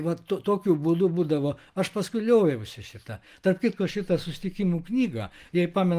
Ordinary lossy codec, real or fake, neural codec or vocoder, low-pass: Opus, 24 kbps; real; none; 14.4 kHz